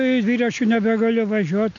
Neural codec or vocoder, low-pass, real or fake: none; 7.2 kHz; real